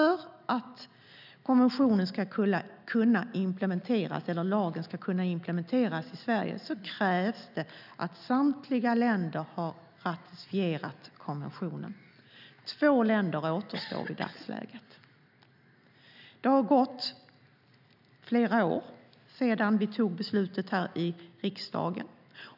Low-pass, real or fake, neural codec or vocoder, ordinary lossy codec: 5.4 kHz; real; none; none